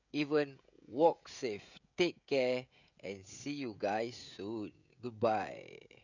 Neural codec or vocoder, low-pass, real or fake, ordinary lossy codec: codec, 16 kHz, 16 kbps, FreqCodec, smaller model; 7.2 kHz; fake; none